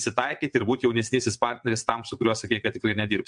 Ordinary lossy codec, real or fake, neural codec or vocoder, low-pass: MP3, 64 kbps; fake; vocoder, 22.05 kHz, 80 mel bands, WaveNeXt; 9.9 kHz